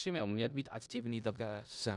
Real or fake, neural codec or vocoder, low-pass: fake; codec, 16 kHz in and 24 kHz out, 0.4 kbps, LongCat-Audio-Codec, four codebook decoder; 10.8 kHz